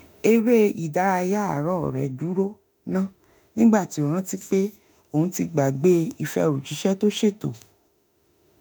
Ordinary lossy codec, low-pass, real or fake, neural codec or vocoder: none; none; fake; autoencoder, 48 kHz, 32 numbers a frame, DAC-VAE, trained on Japanese speech